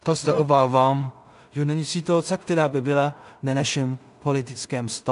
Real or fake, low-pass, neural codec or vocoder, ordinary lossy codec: fake; 10.8 kHz; codec, 16 kHz in and 24 kHz out, 0.4 kbps, LongCat-Audio-Codec, two codebook decoder; AAC, 96 kbps